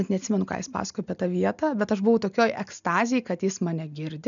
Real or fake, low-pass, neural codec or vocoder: real; 7.2 kHz; none